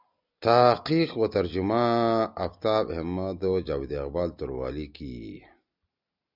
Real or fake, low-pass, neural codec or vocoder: real; 5.4 kHz; none